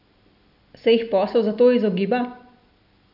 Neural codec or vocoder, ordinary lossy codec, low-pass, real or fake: none; none; 5.4 kHz; real